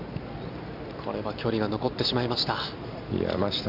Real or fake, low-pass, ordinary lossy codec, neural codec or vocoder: real; 5.4 kHz; AAC, 48 kbps; none